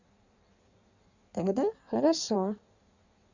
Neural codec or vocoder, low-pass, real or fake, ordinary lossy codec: codec, 16 kHz in and 24 kHz out, 1.1 kbps, FireRedTTS-2 codec; 7.2 kHz; fake; Opus, 64 kbps